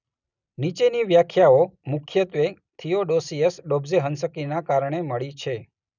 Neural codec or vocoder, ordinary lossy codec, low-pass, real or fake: none; none; 7.2 kHz; real